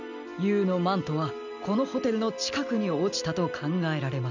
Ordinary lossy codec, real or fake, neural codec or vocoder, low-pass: none; real; none; 7.2 kHz